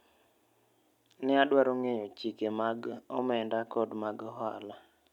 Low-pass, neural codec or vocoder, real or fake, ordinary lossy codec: 19.8 kHz; none; real; none